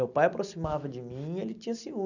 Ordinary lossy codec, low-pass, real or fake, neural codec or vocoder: none; 7.2 kHz; real; none